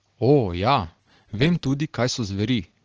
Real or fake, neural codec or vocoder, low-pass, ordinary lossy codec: real; none; 7.2 kHz; Opus, 16 kbps